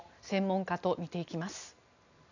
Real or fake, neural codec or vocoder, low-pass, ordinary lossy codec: real; none; 7.2 kHz; none